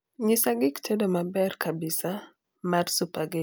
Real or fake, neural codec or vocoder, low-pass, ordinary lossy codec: real; none; none; none